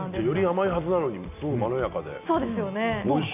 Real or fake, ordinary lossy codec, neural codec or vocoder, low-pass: real; none; none; 3.6 kHz